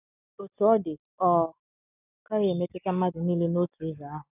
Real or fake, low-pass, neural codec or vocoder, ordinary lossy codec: real; 3.6 kHz; none; none